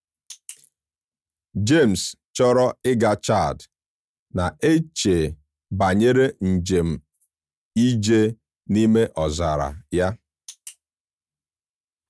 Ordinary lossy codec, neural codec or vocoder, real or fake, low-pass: none; none; real; none